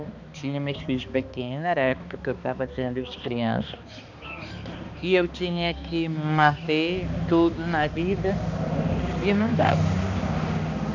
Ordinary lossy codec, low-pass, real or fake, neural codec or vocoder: none; 7.2 kHz; fake; codec, 16 kHz, 2 kbps, X-Codec, HuBERT features, trained on balanced general audio